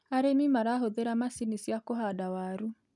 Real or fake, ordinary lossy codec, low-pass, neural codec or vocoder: real; none; 10.8 kHz; none